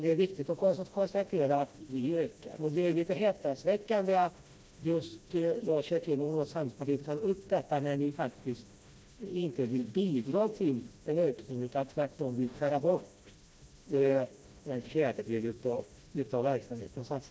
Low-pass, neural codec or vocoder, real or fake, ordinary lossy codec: none; codec, 16 kHz, 1 kbps, FreqCodec, smaller model; fake; none